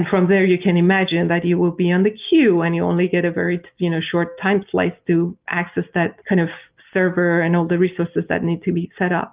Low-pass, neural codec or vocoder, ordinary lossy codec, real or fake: 3.6 kHz; none; Opus, 32 kbps; real